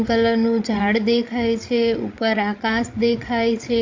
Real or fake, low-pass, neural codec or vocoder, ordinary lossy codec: fake; 7.2 kHz; codec, 16 kHz, 16 kbps, FreqCodec, larger model; AAC, 48 kbps